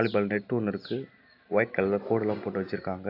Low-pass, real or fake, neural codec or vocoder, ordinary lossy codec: 5.4 kHz; real; none; AAC, 32 kbps